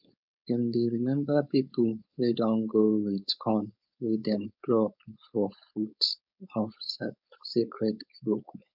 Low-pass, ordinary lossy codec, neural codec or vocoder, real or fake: 5.4 kHz; none; codec, 16 kHz, 4.8 kbps, FACodec; fake